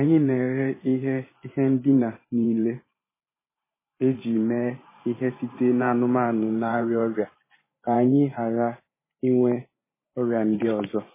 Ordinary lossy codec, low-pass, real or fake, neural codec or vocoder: MP3, 16 kbps; 3.6 kHz; fake; vocoder, 44.1 kHz, 128 mel bands every 512 samples, BigVGAN v2